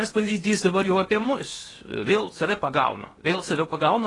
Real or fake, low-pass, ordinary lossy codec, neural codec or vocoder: fake; 10.8 kHz; AAC, 32 kbps; codec, 16 kHz in and 24 kHz out, 0.8 kbps, FocalCodec, streaming, 65536 codes